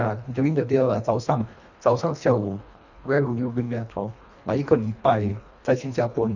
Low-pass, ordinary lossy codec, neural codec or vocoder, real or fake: 7.2 kHz; none; codec, 24 kHz, 1.5 kbps, HILCodec; fake